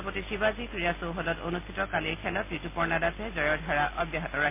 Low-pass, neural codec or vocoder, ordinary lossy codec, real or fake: 3.6 kHz; none; MP3, 24 kbps; real